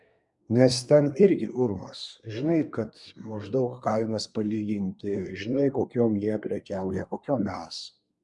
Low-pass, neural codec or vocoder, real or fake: 10.8 kHz; codec, 24 kHz, 1 kbps, SNAC; fake